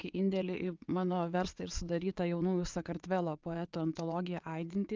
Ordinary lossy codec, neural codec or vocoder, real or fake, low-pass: Opus, 32 kbps; vocoder, 22.05 kHz, 80 mel bands, WaveNeXt; fake; 7.2 kHz